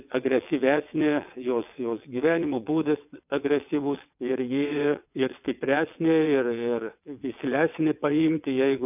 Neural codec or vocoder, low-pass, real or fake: vocoder, 22.05 kHz, 80 mel bands, WaveNeXt; 3.6 kHz; fake